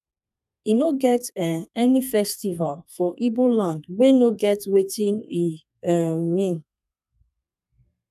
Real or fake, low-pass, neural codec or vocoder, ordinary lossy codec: fake; 14.4 kHz; codec, 32 kHz, 1.9 kbps, SNAC; none